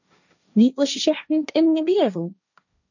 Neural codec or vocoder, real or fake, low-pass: codec, 16 kHz, 1.1 kbps, Voila-Tokenizer; fake; 7.2 kHz